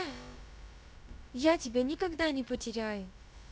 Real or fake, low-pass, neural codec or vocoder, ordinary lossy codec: fake; none; codec, 16 kHz, about 1 kbps, DyCAST, with the encoder's durations; none